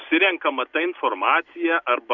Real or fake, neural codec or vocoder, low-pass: real; none; 7.2 kHz